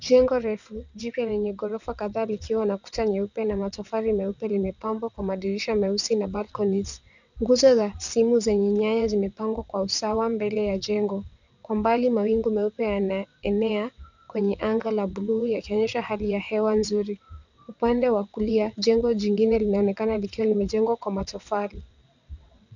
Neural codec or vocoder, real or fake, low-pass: vocoder, 22.05 kHz, 80 mel bands, WaveNeXt; fake; 7.2 kHz